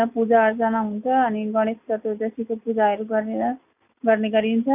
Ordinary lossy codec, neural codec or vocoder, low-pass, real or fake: none; none; 3.6 kHz; real